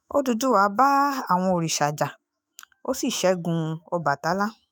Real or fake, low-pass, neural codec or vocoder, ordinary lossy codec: fake; none; autoencoder, 48 kHz, 128 numbers a frame, DAC-VAE, trained on Japanese speech; none